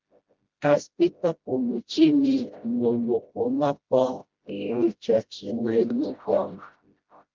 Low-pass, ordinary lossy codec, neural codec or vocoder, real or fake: 7.2 kHz; Opus, 24 kbps; codec, 16 kHz, 0.5 kbps, FreqCodec, smaller model; fake